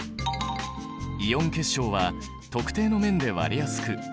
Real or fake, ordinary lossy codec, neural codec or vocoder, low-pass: real; none; none; none